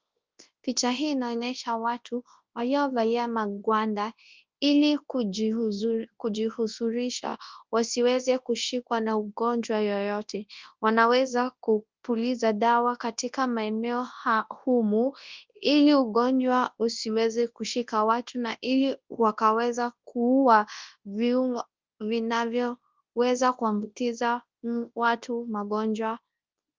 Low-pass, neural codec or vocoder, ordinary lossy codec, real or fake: 7.2 kHz; codec, 24 kHz, 0.9 kbps, WavTokenizer, large speech release; Opus, 24 kbps; fake